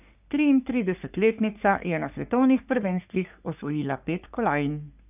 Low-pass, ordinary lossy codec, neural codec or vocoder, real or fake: 3.6 kHz; none; codec, 44.1 kHz, 3.4 kbps, Pupu-Codec; fake